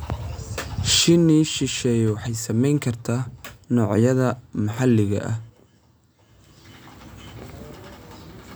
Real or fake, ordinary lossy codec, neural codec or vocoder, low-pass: real; none; none; none